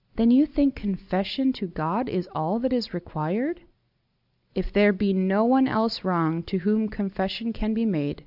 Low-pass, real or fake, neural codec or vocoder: 5.4 kHz; real; none